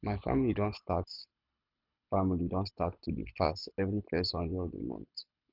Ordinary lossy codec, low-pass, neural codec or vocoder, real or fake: none; 5.4 kHz; codec, 24 kHz, 6 kbps, HILCodec; fake